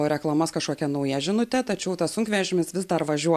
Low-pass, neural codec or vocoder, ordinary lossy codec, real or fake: 14.4 kHz; none; AAC, 96 kbps; real